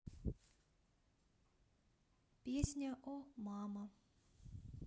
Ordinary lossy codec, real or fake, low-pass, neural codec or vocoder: none; real; none; none